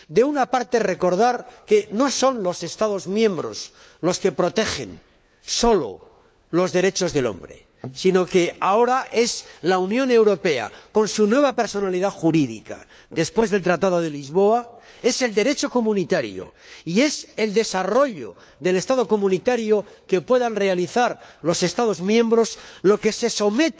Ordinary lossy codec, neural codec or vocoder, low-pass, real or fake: none; codec, 16 kHz, 4 kbps, FunCodec, trained on LibriTTS, 50 frames a second; none; fake